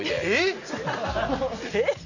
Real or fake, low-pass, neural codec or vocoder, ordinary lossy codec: real; 7.2 kHz; none; none